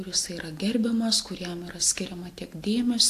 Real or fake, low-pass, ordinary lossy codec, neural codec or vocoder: real; 14.4 kHz; AAC, 64 kbps; none